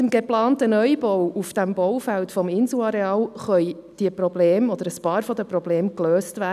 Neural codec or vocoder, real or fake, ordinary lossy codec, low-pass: none; real; none; 14.4 kHz